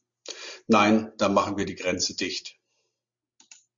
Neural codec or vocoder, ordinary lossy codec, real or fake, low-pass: none; MP3, 64 kbps; real; 7.2 kHz